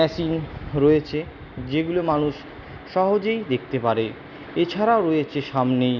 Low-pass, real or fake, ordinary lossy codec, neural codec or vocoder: 7.2 kHz; real; none; none